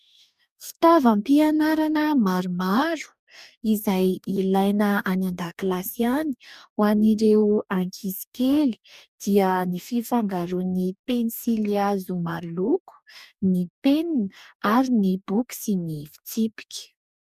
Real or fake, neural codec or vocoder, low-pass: fake; codec, 44.1 kHz, 2.6 kbps, DAC; 14.4 kHz